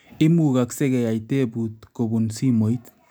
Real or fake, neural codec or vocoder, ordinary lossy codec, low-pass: real; none; none; none